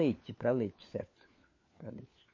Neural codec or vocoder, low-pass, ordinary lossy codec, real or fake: codec, 16 kHz, 4 kbps, FunCodec, trained on LibriTTS, 50 frames a second; 7.2 kHz; MP3, 32 kbps; fake